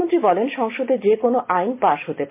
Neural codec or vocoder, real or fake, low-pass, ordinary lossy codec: none; real; 3.6 kHz; MP3, 24 kbps